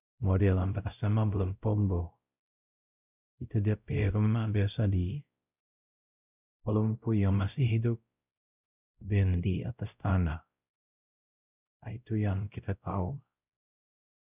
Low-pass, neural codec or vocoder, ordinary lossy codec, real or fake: 3.6 kHz; codec, 16 kHz, 0.5 kbps, X-Codec, WavLM features, trained on Multilingual LibriSpeech; none; fake